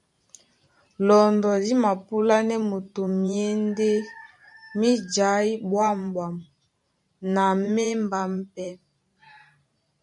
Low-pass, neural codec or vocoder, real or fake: 10.8 kHz; vocoder, 44.1 kHz, 128 mel bands every 512 samples, BigVGAN v2; fake